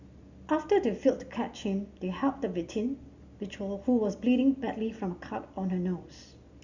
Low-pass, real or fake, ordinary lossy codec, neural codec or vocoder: 7.2 kHz; real; Opus, 64 kbps; none